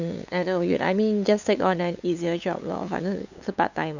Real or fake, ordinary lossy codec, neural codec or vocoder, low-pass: fake; none; codec, 16 kHz, 8 kbps, FunCodec, trained on LibriTTS, 25 frames a second; 7.2 kHz